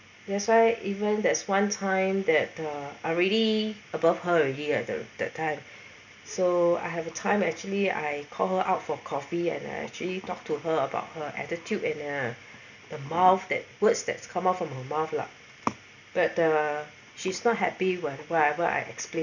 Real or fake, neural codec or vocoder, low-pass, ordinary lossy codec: real; none; 7.2 kHz; none